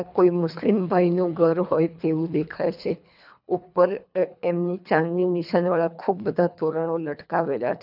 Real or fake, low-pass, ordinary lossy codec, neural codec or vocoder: fake; 5.4 kHz; none; codec, 24 kHz, 3 kbps, HILCodec